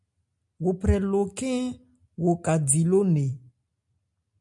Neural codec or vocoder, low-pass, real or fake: none; 10.8 kHz; real